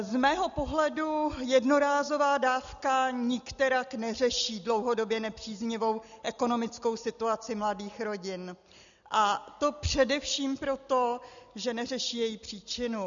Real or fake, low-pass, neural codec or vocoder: real; 7.2 kHz; none